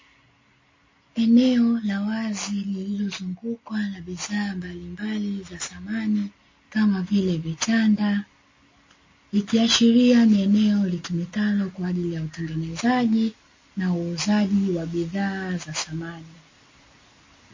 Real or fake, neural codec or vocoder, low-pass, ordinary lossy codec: real; none; 7.2 kHz; MP3, 32 kbps